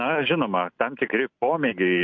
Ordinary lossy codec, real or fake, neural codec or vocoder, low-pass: MP3, 48 kbps; real; none; 7.2 kHz